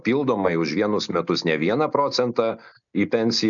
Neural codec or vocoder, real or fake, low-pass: none; real; 7.2 kHz